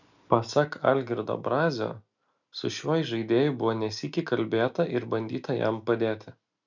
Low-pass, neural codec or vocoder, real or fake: 7.2 kHz; none; real